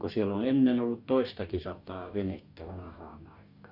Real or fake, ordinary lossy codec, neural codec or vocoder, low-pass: fake; none; codec, 44.1 kHz, 2.6 kbps, DAC; 5.4 kHz